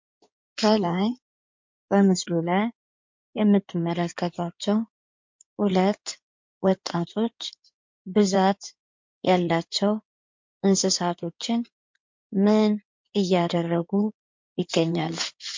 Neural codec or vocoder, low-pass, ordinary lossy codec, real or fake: codec, 16 kHz in and 24 kHz out, 2.2 kbps, FireRedTTS-2 codec; 7.2 kHz; MP3, 48 kbps; fake